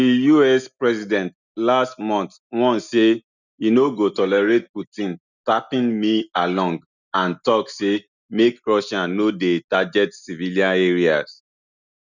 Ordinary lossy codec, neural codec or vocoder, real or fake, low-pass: none; none; real; 7.2 kHz